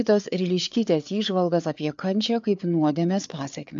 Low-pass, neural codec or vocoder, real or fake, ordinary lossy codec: 7.2 kHz; codec, 16 kHz, 4 kbps, FreqCodec, larger model; fake; AAC, 64 kbps